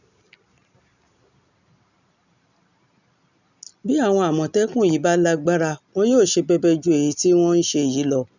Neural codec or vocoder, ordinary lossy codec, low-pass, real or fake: none; none; 7.2 kHz; real